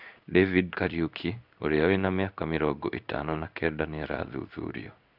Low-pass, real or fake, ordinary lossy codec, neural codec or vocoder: 5.4 kHz; fake; none; codec, 16 kHz in and 24 kHz out, 1 kbps, XY-Tokenizer